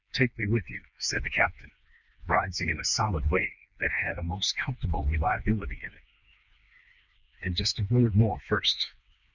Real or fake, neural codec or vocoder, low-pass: fake; codec, 16 kHz, 2 kbps, FreqCodec, smaller model; 7.2 kHz